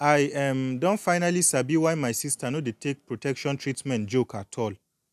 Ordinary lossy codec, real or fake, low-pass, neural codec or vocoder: none; real; 14.4 kHz; none